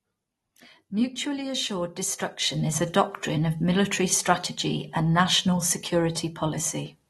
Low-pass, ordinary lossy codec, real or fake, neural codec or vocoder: 19.8 kHz; AAC, 32 kbps; real; none